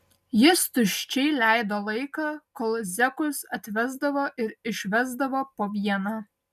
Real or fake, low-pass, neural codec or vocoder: real; 14.4 kHz; none